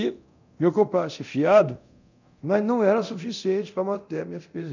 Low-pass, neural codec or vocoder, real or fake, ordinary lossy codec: 7.2 kHz; codec, 24 kHz, 0.9 kbps, DualCodec; fake; none